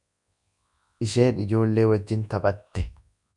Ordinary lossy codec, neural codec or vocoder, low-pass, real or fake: MP3, 96 kbps; codec, 24 kHz, 0.9 kbps, WavTokenizer, large speech release; 10.8 kHz; fake